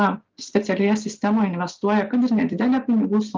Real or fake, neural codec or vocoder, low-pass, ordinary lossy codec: real; none; 7.2 kHz; Opus, 24 kbps